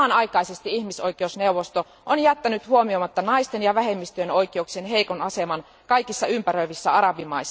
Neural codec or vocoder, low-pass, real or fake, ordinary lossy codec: none; none; real; none